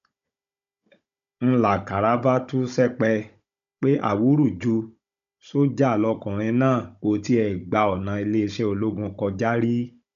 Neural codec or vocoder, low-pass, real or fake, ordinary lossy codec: codec, 16 kHz, 16 kbps, FunCodec, trained on Chinese and English, 50 frames a second; 7.2 kHz; fake; none